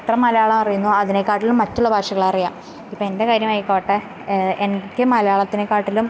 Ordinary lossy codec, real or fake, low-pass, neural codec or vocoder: none; real; none; none